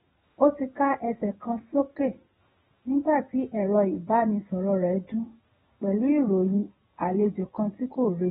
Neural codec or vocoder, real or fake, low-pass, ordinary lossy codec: none; real; 7.2 kHz; AAC, 16 kbps